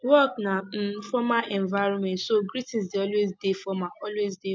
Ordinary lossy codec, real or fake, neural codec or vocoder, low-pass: none; real; none; none